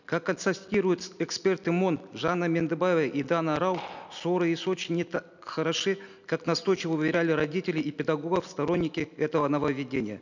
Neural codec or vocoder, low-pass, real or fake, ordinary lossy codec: none; 7.2 kHz; real; none